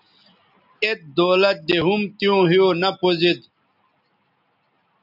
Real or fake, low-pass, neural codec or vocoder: real; 5.4 kHz; none